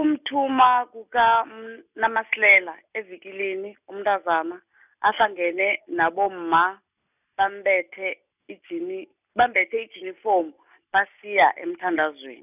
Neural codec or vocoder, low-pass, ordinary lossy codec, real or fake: none; 3.6 kHz; none; real